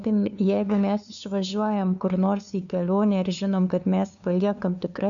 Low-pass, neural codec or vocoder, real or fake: 7.2 kHz; codec, 16 kHz, 2 kbps, FunCodec, trained on LibriTTS, 25 frames a second; fake